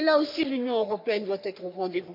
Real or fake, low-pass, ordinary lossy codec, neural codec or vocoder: fake; 5.4 kHz; MP3, 48 kbps; codec, 44.1 kHz, 3.4 kbps, Pupu-Codec